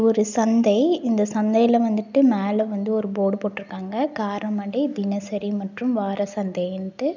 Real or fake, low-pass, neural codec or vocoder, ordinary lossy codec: real; 7.2 kHz; none; none